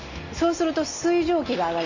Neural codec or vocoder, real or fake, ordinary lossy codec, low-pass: none; real; none; 7.2 kHz